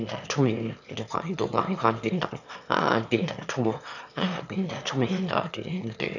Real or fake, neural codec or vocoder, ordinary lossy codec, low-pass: fake; autoencoder, 22.05 kHz, a latent of 192 numbers a frame, VITS, trained on one speaker; none; 7.2 kHz